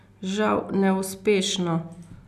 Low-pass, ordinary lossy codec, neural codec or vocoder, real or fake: 14.4 kHz; none; none; real